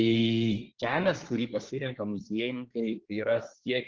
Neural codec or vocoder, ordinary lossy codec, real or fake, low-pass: codec, 24 kHz, 1 kbps, SNAC; Opus, 16 kbps; fake; 7.2 kHz